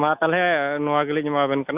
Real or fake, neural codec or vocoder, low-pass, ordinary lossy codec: real; none; 3.6 kHz; Opus, 64 kbps